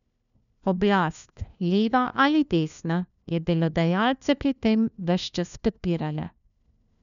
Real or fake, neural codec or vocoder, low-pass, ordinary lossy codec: fake; codec, 16 kHz, 1 kbps, FunCodec, trained on LibriTTS, 50 frames a second; 7.2 kHz; none